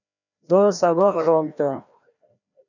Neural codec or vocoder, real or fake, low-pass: codec, 16 kHz, 1 kbps, FreqCodec, larger model; fake; 7.2 kHz